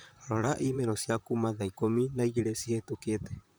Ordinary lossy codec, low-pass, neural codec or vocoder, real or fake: none; none; vocoder, 44.1 kHz, 128 mel bands, Pupu-Vocoder; fake